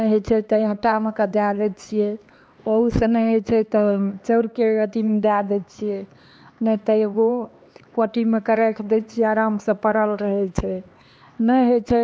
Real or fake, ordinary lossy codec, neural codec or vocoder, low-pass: fake; none; codec, 16 kHz, 2 kbps, X-Codec, HuBERT features, trained on LibriSpeech; none